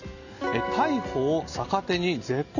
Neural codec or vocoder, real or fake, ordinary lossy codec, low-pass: none; real; none; 7.2 kHz